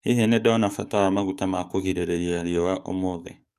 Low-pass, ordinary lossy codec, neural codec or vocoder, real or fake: 14.4 kHz; none; codec, 44.1 kHz, 7.8 kbps, DAC; fake